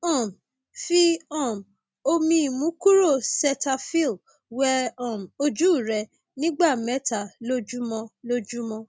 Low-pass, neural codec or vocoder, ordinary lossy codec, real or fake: none; none; none; real